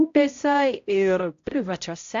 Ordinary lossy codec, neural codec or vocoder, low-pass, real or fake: AAC, 48 kbps; codec, 16 kHz, 0.5 kbps, X-Codec, HuBERT features, trained on balanced general audio; 7.2 kHz; fake